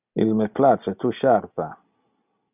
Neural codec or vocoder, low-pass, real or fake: none; 3.6 kHz; real